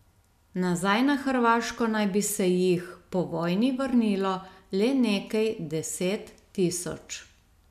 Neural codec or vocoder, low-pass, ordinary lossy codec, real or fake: none; 14.4 kHz; none; real